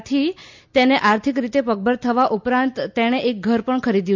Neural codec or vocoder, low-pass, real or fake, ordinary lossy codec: none; 7.2 kHz; real; MP3, 64 kbps